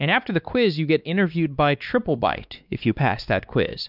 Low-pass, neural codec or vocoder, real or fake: 5.4 kHz; codec, 16 kHz, 2 kbps, X-Codec, WavLM features, trained on Multilingual LibriSpeech; fake